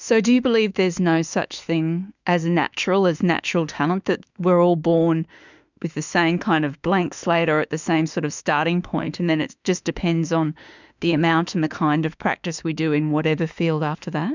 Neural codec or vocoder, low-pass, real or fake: autoencoder, 48 kHz, 32 numbers a frame, DAC-VAE, trained on Japanese speech; 7.2 kHz; fake